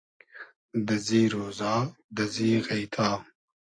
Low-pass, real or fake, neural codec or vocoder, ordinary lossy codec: 9.9 kHz; real; none; AAC, 48 kbps